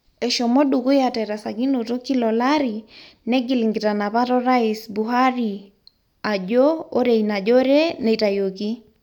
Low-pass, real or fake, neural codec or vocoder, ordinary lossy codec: 19.8 kHz; real; none; none